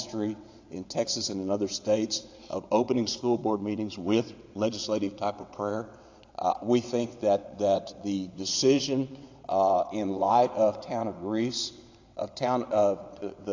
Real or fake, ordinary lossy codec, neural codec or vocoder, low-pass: fake; AAC, 48 kbps; vocoder, 22.05 kHz, 80 mel bands, WaveNeXt; 7.2 kHz